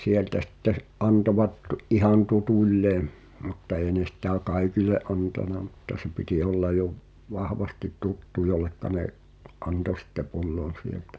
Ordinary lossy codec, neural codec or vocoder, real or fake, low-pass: none; none; real; none